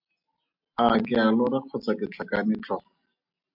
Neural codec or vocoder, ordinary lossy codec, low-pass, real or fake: none; MP3, 48 kbps; 5.4 kHz; real